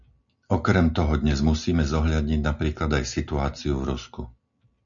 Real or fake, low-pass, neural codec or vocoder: real; 7.2 kHz; none